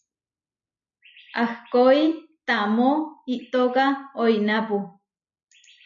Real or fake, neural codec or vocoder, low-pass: real; none; 7.2 kHz